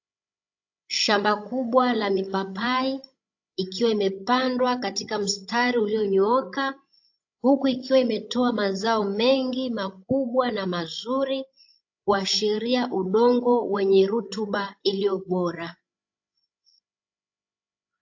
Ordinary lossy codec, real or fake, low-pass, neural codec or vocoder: AAC, 48 kbps; fake; 7.2 kHz; codec, 16 kHz, 16 kbps, FreqCodec, larger model